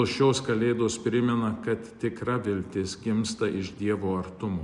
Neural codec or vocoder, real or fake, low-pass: none; real; 10.8 kHz